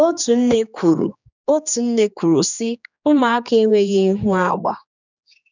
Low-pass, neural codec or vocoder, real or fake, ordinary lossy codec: 7.2 kHz; codec, 16 kHz, 2 kbps, X-Codec, HuBERT features, trained on general audio; fake; none